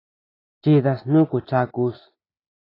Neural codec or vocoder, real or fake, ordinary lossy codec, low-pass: none; real; AAC, 24 kbps; 5.4 kHz